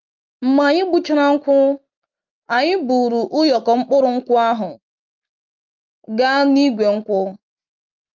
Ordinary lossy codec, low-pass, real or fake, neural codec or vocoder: Opus, 24 kbps; 7.2 kHz; real; none